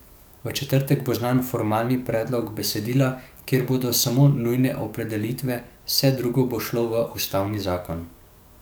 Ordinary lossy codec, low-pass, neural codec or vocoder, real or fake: none; none; codec, 44.1 kHz, 7.8 kbps, DAC; fake